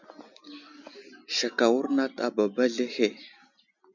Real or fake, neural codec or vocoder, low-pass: real; none; 7.2 kHz